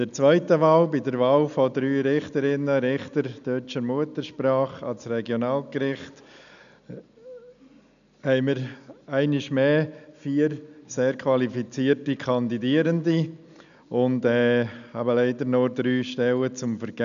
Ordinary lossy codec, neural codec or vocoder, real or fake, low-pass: none; none; real; 7.2 kHz